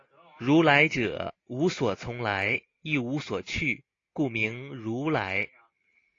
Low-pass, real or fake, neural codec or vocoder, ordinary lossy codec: 7.2 kHz; real; none; AAC, 32 kbps